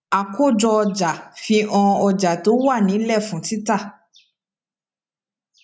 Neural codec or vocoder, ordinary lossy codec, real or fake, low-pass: none; none; real; none